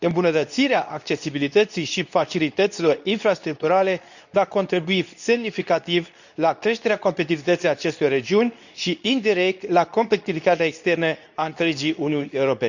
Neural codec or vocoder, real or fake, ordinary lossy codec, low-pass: codec, 24 kHz, 0.9 kbps, WavTokenizer, medium speech release version 2; fake; none; 7.2 kHz